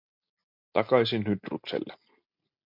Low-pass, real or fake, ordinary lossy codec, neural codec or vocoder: 5.4 kHz; real; MP3, 48 kbps; none